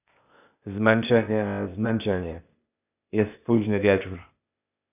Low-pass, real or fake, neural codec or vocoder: 3.6 kHz; fake; codec, 16 kHz, 0.8 kbps, ZipCodec